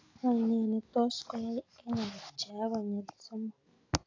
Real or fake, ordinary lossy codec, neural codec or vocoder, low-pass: real; AAC, 32 kbps; none; 7.2 kHz